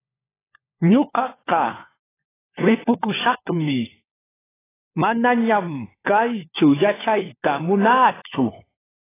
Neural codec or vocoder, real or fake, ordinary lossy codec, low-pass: codec, 16 kHz, 4 kbps, FunCodec, trained on LibriTTS, 50 frames a second; fake; AAC, 16 kbps; 3.6 kHz